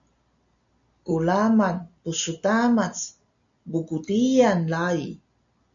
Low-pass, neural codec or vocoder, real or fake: 7.2 kHz; none; real